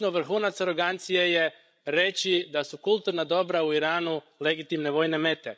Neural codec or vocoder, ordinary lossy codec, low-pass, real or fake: codec, 16 kHz, 16 kbps, FreqCodec, larger model; none; none; fake